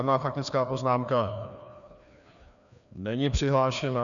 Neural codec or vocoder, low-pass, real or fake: codec, 16 kHz, 2 kbps, FreqCodec, larger model; 7.2 kHz; fake